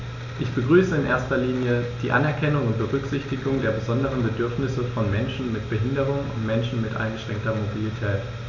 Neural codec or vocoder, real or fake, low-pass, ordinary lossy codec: none; real; 7.2 kHz; none